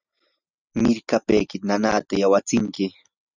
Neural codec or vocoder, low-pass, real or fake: none; 7.2 kHz; real